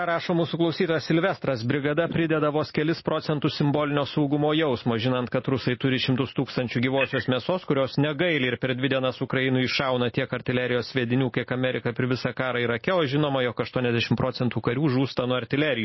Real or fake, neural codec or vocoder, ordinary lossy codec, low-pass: real; none; MP3, 24 kbps; 7.2 kHz